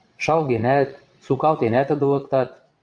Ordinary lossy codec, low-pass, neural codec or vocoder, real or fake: MP3, 64 kbps; 9.9 kHz; vocoder, 22.05 kHz, 80 mel bands, WaveNeXt; fake